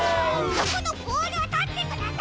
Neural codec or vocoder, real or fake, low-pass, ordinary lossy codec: none; real; none; none